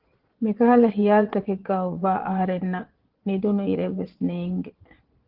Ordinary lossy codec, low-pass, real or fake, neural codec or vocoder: Opus, 16 kbps; 5.4 kHz; fake; vocoder, 22.05 kHz, 80 mel bands, Vocos